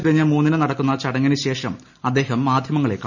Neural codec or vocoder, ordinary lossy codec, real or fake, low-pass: none; none; real; 7.2 kHz